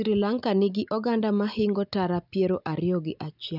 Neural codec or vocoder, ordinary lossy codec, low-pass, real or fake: none; none; 5.4 kHz; real